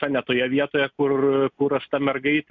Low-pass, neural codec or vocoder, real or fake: 7.2 kHz; none; real